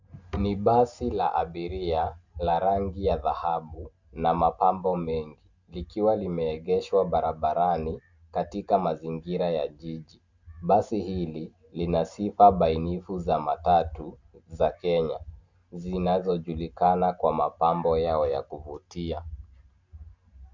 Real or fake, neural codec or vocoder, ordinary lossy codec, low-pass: real; none; Opus, 64 kbps; 7.2 kHz